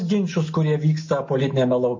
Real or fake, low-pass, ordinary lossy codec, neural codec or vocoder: real; 7.2 kHz; MP3, 32 kbps; none